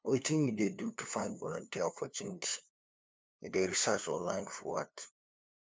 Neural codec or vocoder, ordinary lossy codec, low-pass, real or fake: codec, 16 kHz, 2 kbps, FunCodec, trained on LibriTTS, 25 frames a second; none; none; fake